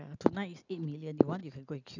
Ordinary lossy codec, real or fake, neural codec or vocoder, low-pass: none; real; none; 7.2 kHz